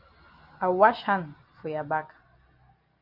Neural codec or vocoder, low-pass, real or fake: none; 5.4 kHz; real